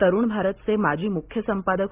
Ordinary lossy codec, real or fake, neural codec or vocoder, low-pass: Opus, 32 kbps; real; none; 3.6 kHz